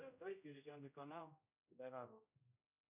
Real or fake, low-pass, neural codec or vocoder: fake; 3.6 kHz; codec, 16 kHz, 0.5 kbps, X-Codec, HuBERT features, trained on general audio